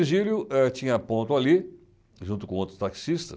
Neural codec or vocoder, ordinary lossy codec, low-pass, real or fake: none; none; none; real